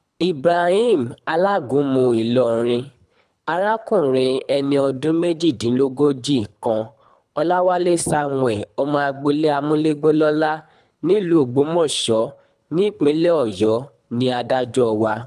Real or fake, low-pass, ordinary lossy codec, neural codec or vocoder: fake; none; none; codec, 24 kHz, 3 kbps, HILCodec